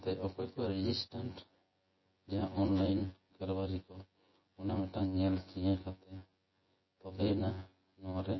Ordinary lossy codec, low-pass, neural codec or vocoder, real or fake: MP3, 24 kbps; 7.2 kHz; vocoder, 24 kHz, 100 mel bands, Vocos; fake